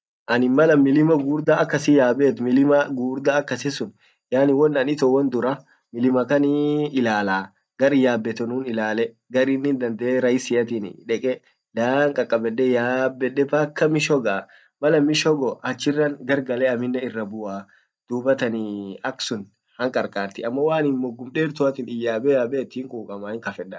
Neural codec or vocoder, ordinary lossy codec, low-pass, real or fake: none; none; none; real